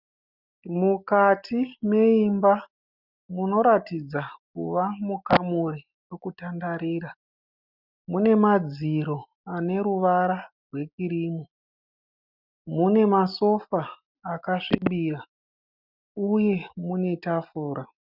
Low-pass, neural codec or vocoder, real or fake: 5.4 kHz; none; real